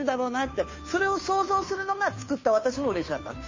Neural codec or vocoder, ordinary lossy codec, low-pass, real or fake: codec, 16 kHz, 2 kbps, FunCodec, trained on Chinese and English, 25 frames a second; MP3, 32 kbps; 7.2 kHz; fake